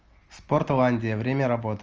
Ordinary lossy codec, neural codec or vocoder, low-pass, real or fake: Opus, 24 kbps; none; 7.2 kHz; real